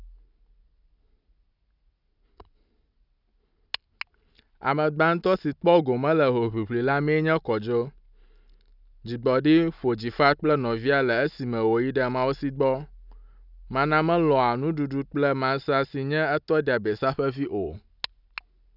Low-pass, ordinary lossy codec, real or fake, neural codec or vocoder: 5.4 kHz; none; real; none